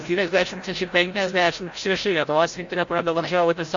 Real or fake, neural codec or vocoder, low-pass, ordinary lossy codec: fake; codec, 16 kHz, 0.5 kbps, FreqCodec, larger model; 7.2 kHz; AAC, 48 kbps